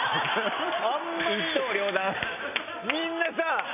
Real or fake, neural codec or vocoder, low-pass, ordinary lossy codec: real; none; 3.6 kHz; none